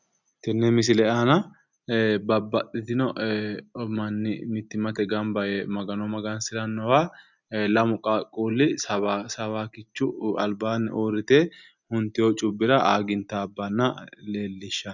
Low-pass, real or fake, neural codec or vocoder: 7.2 kHz; real; none